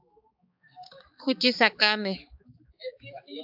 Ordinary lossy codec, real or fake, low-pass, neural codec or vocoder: AAC, 48 kbps; fake; 5.4 kHz; codec, 16 kHz, 4 kbps, X-Codec, HuBERT features, trained on balanced general audio